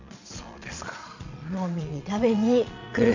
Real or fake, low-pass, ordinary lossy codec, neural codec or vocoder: fake; 7.2 kHz; AAC, 48 kbps; vocoder, 22.05 kHz, 80 mel bands, Vocos